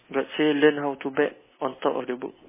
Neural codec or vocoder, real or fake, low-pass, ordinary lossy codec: none; real; 3.6 kHz; MP3, 16 kbps